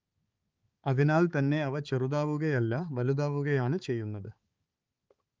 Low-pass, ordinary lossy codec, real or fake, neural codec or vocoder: 7.2 kHz; Opus, 24 kbps; fake; codec, 16 kHz, 4 kbps, X-Codec, HuBERT features, trained on balanced general audio